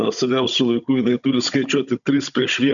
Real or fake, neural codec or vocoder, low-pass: fake; codec, 16 kHz, 16 kbps, FunCodec, trained on Chinese and English, 50 frames a second; 7.2 kHz